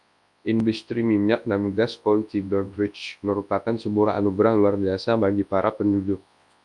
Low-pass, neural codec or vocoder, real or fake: 10.8 kHz; codec, 24 kHz, 0.9 kbps, WavTokenizer, large speech release; fake